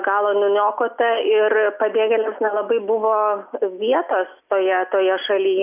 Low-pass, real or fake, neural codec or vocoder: 3.6 kHz; real; none